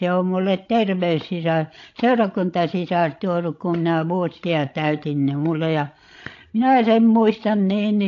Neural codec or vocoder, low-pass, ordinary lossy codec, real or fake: codec, 16 kHz, 16 kbps, FreqCodec, larger model; 7.2 kHz; AAC, 48 kbps; fake